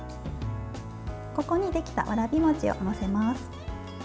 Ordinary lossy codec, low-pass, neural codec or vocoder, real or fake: none; none; none; real